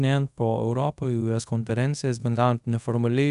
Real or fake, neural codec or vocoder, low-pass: fake; codec, 24 kHz, 0.5 kbps, DualCodec; 10.8 kHz